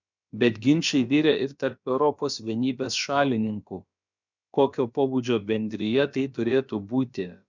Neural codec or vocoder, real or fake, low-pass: codec, 16 kHz, about 1 kbps, DyCAST, with the encoder's durations; fake; 7.2 kHz